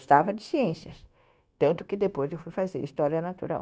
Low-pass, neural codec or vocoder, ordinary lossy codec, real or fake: none; codec, 16 kHz, 0.9 kbps, LongCat-Audio-Codec; none; fake